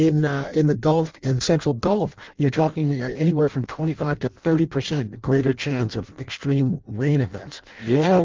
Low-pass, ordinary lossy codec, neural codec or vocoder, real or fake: 7.2 kHz; Opus, 32 kbps; codec, 16 kHz in and 24 kHz out, 0.6 kbps, FireRedTTS-2 codec; fake